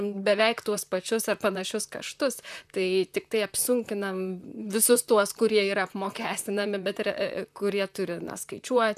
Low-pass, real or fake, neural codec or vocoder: 14.4 kHz; fake; vocoder, 44.1 kHz, 128 mel bands, Pupu-Vocoder